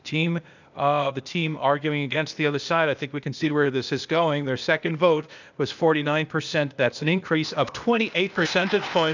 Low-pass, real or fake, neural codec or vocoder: 7.2 kHz; fake; codec, 16 kHz, 0.8 kbps, ZipCodec